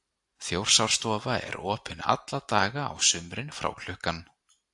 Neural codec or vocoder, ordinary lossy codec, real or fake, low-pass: none; AAC, 64 kbps; real; 10.8 kHz